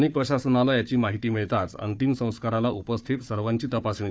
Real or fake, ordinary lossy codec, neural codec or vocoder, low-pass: fake; none; codec, 16 kHz, 4 kbps, FunCodec, trained on Chinese and English, 50 frames a second; none